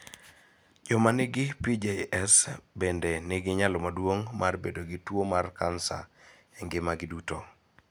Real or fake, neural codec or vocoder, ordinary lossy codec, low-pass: real; none; none; none